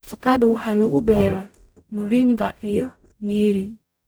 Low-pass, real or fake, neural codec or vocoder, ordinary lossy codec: none; fake; codec, 44.1 kHz, 0.9 kbps, DAC; none